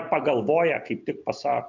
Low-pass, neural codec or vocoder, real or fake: 7.2 kHz; none; real